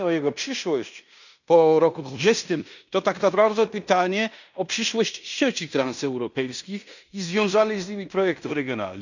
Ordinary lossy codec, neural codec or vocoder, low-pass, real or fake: none; codec, 16 kHz in and 24 kHz out, 0.9 kbps, LongCat-Audio-Codec, fine tuned four codebook decoder; 7.2 kHz; fake